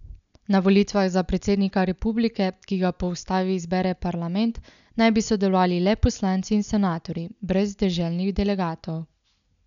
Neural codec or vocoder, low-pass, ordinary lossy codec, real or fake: none; 7.2 kHz; none; real